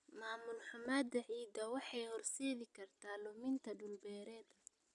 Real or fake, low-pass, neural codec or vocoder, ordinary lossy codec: fake; 10.8 kHz; vocoder, 44.1 kHz, 128 mel bands every 256 samples, BigVGAN v2; none